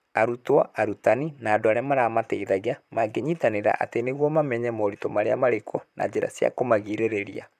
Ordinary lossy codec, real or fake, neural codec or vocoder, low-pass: none; fake; vocoder, 44.1 kHz, 128 mel bands, Pupu-Vocoder; 14.4 kHz